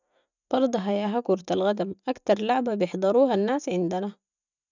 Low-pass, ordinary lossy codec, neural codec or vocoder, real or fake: 7.2 kHz; none; none; real